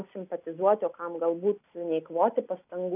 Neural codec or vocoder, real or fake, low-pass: none; real; 3.6 kHz